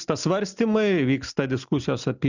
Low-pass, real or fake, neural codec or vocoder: 7.2 kHz; real; none